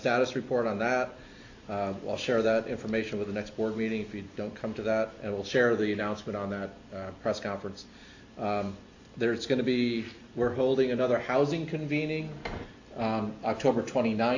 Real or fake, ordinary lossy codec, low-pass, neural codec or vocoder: real; AAC, 48 kbps; 7.2 kHz; none